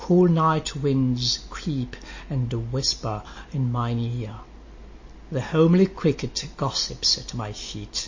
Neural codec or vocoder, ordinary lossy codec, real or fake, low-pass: none; MP3, 32 kbps; real; 7.2 kHz